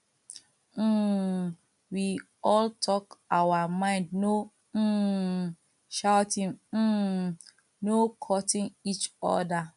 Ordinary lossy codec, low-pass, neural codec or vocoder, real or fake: AAC, 96 kbps; 10.8 kHz; none; real